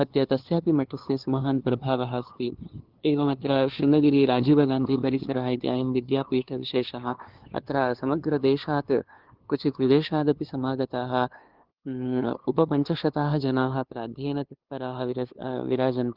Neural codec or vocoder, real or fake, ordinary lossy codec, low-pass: codec, 16 kHz, 2 kbps, FunCodec, trained on LibriTTS, 25 frames a second; fake; Opus, 16 kbps; 5.4 kHz